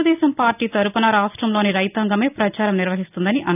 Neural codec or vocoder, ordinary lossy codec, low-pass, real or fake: none; none; 3.6 kHz; real